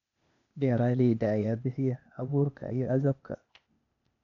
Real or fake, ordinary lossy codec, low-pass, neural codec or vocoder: fake; none; 7.2 kHz; codec, 16 kHz, 0.8 kbps, ZipCodec